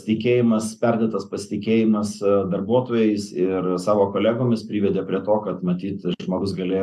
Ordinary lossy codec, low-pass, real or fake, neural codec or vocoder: MP3, 96 kbps; 14.4 kHz; real; none